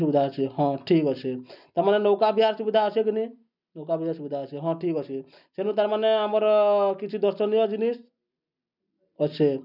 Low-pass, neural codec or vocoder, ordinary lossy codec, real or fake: 5.4 kHz; none; none; real